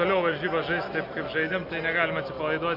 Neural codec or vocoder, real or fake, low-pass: none; real; 5.4 kHz